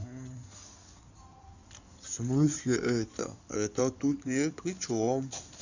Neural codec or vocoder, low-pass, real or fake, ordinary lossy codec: codec, 16 kHz in and 24 kHz out, 2.2 kbps, FireRedTTS-2 codec; 7.2 kHz; fake; none